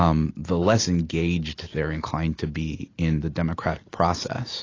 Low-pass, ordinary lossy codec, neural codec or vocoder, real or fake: 7.2 kHz; AAC, 32 kbps; none; real